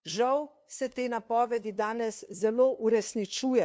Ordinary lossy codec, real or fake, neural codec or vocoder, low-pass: none; fake; codec, 16 kHz, 2 kbps, FunCodec, trained on LibriTTS, 25 frames a second; none